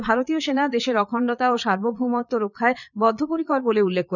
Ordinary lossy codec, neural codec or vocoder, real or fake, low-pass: none; vocoder, 22.05 kHz, 80 mel bands, Vocos; fake; 7.2 kHz